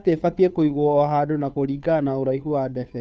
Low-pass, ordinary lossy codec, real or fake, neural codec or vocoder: none; none; fake; codec, 16 kHz, 2 kbps, FunCodec, trained on Chinese and English, 25 frames a second